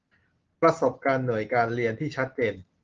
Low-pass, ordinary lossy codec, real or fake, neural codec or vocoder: 7.2 kHz; Opus, 16 kbps; real; none